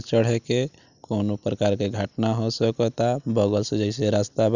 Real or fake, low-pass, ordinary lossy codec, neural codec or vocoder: real; 7.2 kHz; none; none